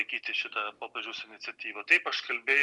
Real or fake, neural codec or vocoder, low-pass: real; none; 10.8 kHz